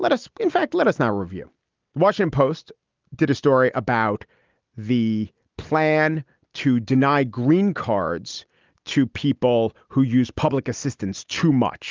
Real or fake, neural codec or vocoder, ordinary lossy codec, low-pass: real; none; Opus, 32 kbps; 7.2 kHz